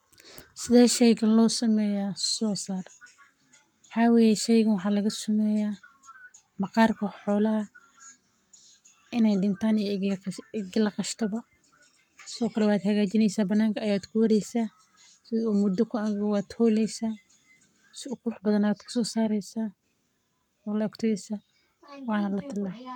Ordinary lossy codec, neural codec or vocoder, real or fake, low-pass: none; codec, 44.1 kHz, 7.8 kbps, Pupu-Codec; fake; 19.8 kHz